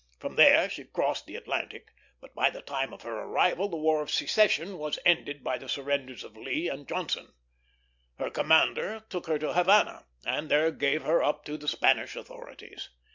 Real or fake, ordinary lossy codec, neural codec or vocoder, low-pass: real; MP3, 64 kbps; none; 7.2 kHz